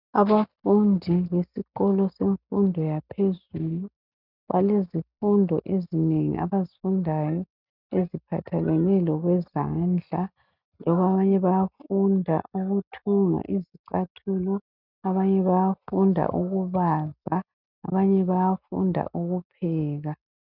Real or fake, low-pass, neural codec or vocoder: real; 5.4 kHz; none